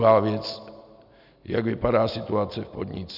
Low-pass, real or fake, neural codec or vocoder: 5.4 kHz; real; none